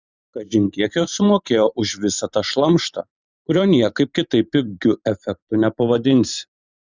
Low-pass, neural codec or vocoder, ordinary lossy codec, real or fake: 7.2 kHz; vocoder, 44.1 kHz, 128 mel bands every 512 samples, BigVGAN v2; Opus, 64 kbps; fake